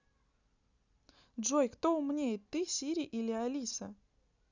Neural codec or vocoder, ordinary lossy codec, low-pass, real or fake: none; none; 7.2 kHz; real